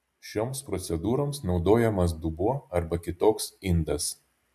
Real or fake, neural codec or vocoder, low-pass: real; none; 14.4 kHz